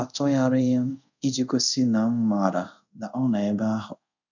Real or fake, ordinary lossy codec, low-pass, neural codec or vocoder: fake; none; 7.2 kHz; codec, 24 kHz, 0.5 kbps, DualCodec